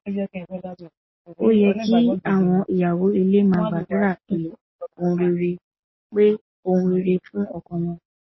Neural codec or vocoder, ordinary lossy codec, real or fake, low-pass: none; MP3, 24 kbps; real; 7.2 kHz